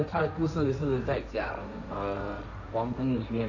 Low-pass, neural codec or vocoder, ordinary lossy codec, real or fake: 7.2 kHz; codec, 16 kHz, 1.1 kbps, Voila-Tokenizer; none; fake